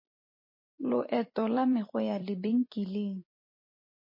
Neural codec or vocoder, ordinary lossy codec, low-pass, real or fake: vocoder, 44.1 kHz, 128 mel bands every 256 samples, BigVGAN v2; MP3, 24 kbps; 5.4 kHz; fake